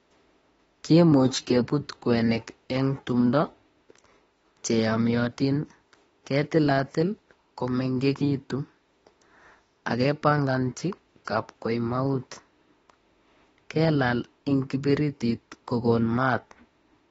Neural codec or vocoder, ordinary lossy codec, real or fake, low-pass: autoencoder, 48 kHz, 32 numbers a frame, DAC-VAE, trained on Japanese speech; AAC, 24 kbps; fake; 19.8 kHz